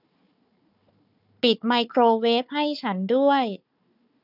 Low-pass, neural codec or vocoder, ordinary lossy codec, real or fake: 5.4 kHz; codec, 16 kHz, 4 kbps, FunCodec, trained on Chinese and English, 50 frames a second; none; fake